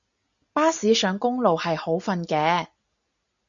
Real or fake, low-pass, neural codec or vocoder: real; 7.2 kHz; none